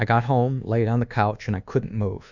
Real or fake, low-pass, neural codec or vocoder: fake; 7.2 kHz; codec, 24 kHz, 1.2 kbps, DualCodec